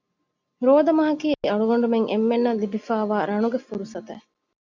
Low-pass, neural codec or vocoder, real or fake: 7.2 kHz; none; real